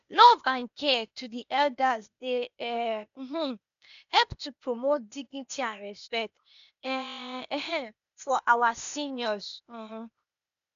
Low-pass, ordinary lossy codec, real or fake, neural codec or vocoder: 7.2 kHz; none; fake; codec, 16 kHz, 0.8 kbps, ZipCodec